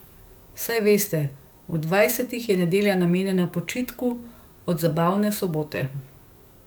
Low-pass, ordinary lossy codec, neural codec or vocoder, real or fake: none; none; codec, 44.1 kHz, 7.8 kbps, DAC; fake